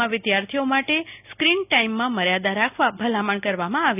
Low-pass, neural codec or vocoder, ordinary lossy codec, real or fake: 3.6 kHz; none; none; real